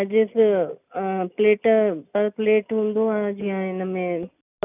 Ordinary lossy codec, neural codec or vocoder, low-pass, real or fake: none; none; 3.6 kHz; real